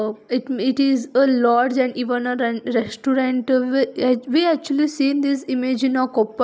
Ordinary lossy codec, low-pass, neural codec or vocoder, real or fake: none; none; none; real